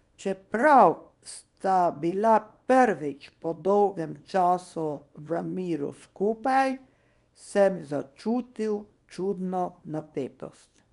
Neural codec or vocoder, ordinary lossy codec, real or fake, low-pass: codec, 24 kHz, 0.9 kbps, WavTokenizer, small release; none; fake; 10.8 kHz